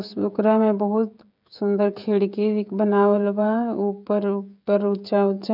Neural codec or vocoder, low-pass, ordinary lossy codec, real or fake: codec, 16 kHz, 16 kbps, FreqCodec, smaller model; 5.4 kHz; none; fake